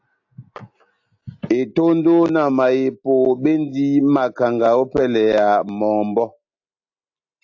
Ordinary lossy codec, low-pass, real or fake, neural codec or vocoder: AAC, 48 kbps; 7.2 kHz; real; none